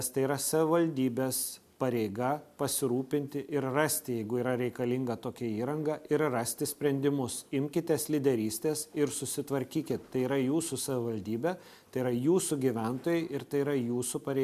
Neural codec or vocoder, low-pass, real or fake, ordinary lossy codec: none; 14.4 kHz; real; MP3, 96 kbps